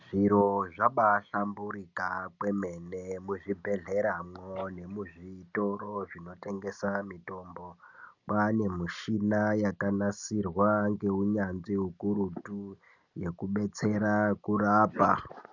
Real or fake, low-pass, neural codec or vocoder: real; 7.2 kHz; none